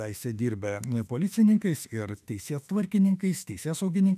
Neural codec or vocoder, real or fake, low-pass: autoencoder, 48 kHz, 32 numbers a frame, DAC-VAE, trained on Japanese speech; fake; 14.4 kHz